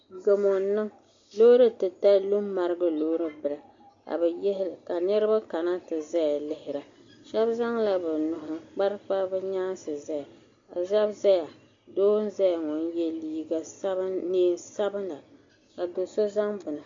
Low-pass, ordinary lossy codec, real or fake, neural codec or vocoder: 7.2 kHz; MP3, 48 kbps; real; none